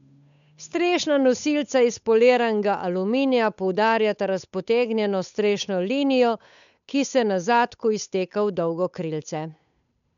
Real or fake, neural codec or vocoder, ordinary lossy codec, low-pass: fake; codec, 16 kHz, 8 kbps, FunCodec, trained on Chinese and English, 25 frames a second; none; 7.2 kHz